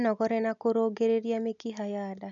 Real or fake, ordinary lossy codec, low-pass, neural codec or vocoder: real; none; 7.2 kHz; none